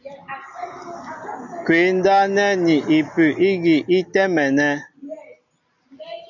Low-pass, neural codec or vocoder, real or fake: 7.2 kHz; none; real